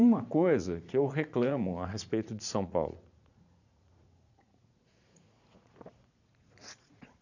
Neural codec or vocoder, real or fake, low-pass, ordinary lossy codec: vocoder, 44.1 kHz, 80 mel bands, Vocos; fake; 7.2 kHz; none